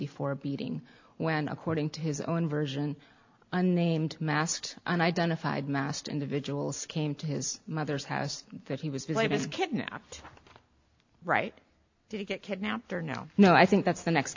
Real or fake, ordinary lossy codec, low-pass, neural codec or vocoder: real; AAC, 48 kbps; 7.2 kHz; none